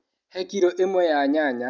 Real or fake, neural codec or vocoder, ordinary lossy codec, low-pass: real; none; none; 7.2 kHz